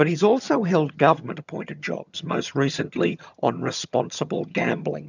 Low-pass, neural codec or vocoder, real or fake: 7.2 kHz; vocoder, 22.05 kHz, 80 mel bands, HiFi-GAN; fake